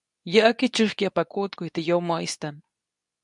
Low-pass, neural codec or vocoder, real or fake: 10.8 kHz; codec, 24 kHz, 0.9 kbps, WavTokenizer, medium speech release version 2; fake